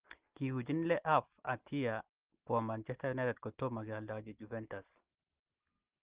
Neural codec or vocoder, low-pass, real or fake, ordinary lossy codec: none; 3.6 kHz; real; Opus, 16 kbps